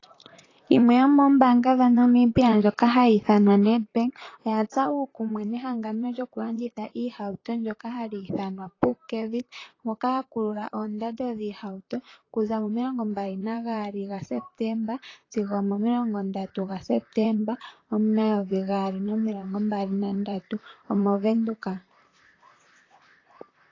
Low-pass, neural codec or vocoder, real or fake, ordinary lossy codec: 7.2 kHz; vocoder, 44.1 kHz, 128 mel bands, Pupu-Vocoder; fake; AAC, 32 kbps